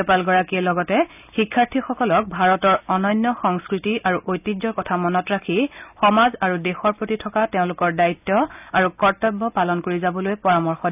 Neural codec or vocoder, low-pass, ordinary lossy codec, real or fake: none; 3.6 kHz; none; real